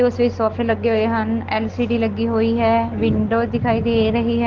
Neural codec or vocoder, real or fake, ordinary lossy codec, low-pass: none; real; Opus, 16 kbps; 7.2 kHz